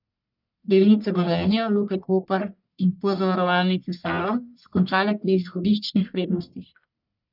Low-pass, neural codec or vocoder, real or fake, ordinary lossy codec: 5.4 kHz; codec, 44.1 kHz, 1.7 kbps, Pupu-Codec; fake; none